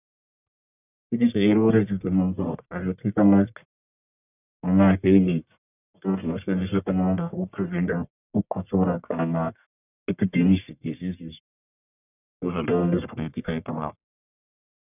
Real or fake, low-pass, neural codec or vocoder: fake; 3.6 kHz; codec, 44.1 kHz, 1.7 kbps, Pupu-Codec